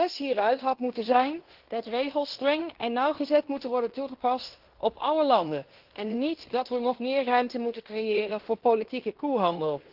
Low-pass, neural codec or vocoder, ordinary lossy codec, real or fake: 5.4 kHz; codec, 16 kHz in and 24 kHz out, 0.9 kbps, LongCat-Audio-Codec, fine tuned four codebook decoder; Opus, 16 kbps; fake